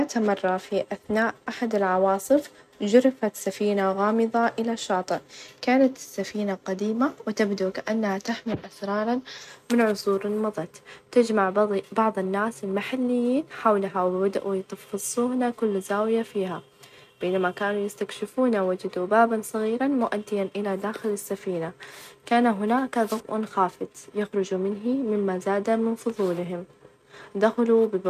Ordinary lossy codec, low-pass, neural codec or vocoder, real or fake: none; 14.4 kHz; none; real